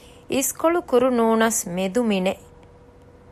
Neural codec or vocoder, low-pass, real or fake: none; 14.4 kHz; real